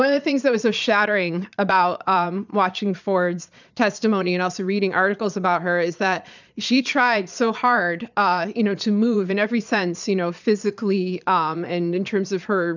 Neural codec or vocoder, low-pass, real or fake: vocoder, 22.05 kHz, 80 mel bands, Vocos; 7.2 kHz; fake